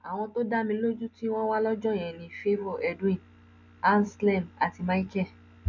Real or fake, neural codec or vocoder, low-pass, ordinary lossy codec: real; none; 7.2 kHz; none